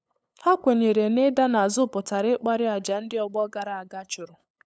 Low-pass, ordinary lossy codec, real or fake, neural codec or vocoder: none; none; fake; codec, 16 kHz, 8 kbps, FunCodec, trained on LibriTTS, 25 frames a second